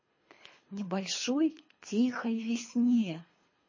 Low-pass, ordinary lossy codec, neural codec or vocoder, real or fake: 7.2 kHz; MP3, 32 kbps; codec, 24 kHz, 3 kbps, HILCodec; fake